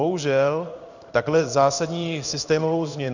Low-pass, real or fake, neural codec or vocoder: 7.2 kHz; fake; codec, 16 kHz in and 24 kHz out, 1 kbps, XY-Tokenizer